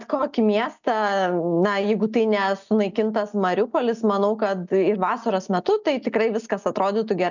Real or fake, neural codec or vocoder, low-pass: real; none; 7.2 kHz